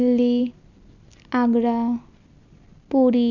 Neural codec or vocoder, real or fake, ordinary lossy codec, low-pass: none; real; none; 7.2 kHz